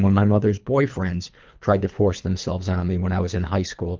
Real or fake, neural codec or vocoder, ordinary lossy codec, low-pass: fake; codec, 24 kHz, 3 kbps, HILCodec; Opus, 32 kbps; 7.2 kHz